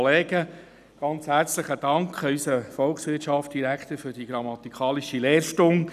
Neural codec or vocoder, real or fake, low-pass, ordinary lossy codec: none; real; 14.4 kHz; none